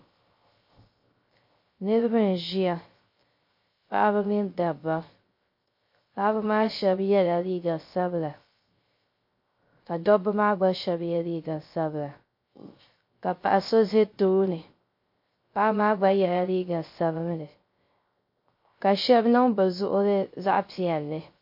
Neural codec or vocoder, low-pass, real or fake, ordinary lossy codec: codec, 16 kHz, 0.3 kbps, FocalCodec; 5.4 kHz; fake; MP3, 32 kbps